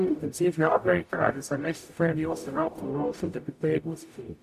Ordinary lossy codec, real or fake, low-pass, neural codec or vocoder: none; fake; 14.4 kHz; codec, 44.1 kHz, 0.9 kbps, DAC